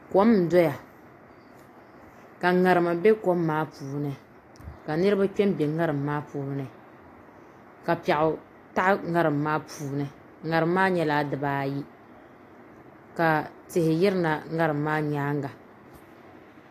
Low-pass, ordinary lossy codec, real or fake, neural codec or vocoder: 14.4 kHz; AAC, 48 kbps; real; none